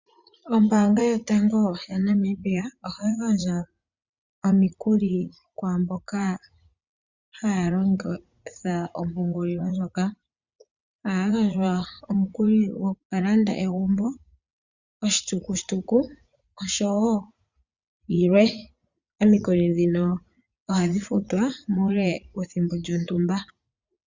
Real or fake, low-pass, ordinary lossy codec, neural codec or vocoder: fake; 7.2 kHz; Opus, 64 kbps; vocoder, 44.1 kHz, 80 mel bands, Vocos